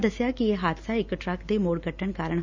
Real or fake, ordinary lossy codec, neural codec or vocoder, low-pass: real; Opus, 64 kbps; none; 7.2 kHz